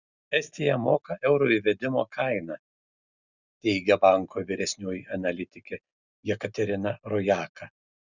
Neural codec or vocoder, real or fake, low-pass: none; real; 7.2 kHz